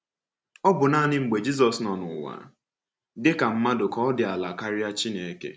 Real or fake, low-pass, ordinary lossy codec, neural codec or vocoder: real; none; none; none